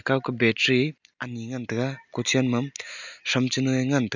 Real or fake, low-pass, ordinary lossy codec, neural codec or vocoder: real; 7.2 kHz; none; none